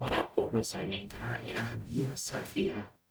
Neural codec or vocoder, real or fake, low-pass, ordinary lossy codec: codec, 44.1 kHz, 0.9 kbps, DAC; fake; none; none